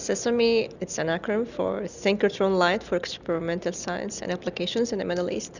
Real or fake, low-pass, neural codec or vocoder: real; 7.2 kHz; none